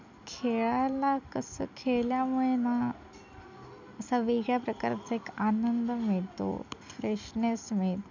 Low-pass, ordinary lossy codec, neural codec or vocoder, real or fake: 7.2 kHz; none; none; real